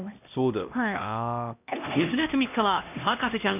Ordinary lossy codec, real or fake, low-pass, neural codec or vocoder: none; fake; 3.6 kHz; codec, 16 kHz, 1 kbps, X-Codec, HuBERT features, trained on LibriSpeech